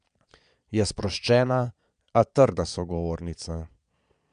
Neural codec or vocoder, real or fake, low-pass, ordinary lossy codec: vocoder, 22.05 kHz, 80 mel bands, Vocos; fake; 9.9 kHz; none